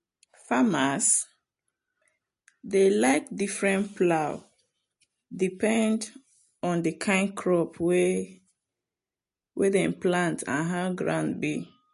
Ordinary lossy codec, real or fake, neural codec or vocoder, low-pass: MP3, 48 kbps; real; none; 10.8 kHz